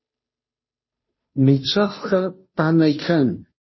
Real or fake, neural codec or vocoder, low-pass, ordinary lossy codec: fake; codec, 16 kHz, 0.5 kbps, FunCodec, trained on Chinese and English, 25 frames a second; 7.2 kHz; MP3, 24 kbps